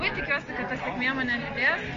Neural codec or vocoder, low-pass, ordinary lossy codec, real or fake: none; 7.2 kHz; MP3, 48 kbps; real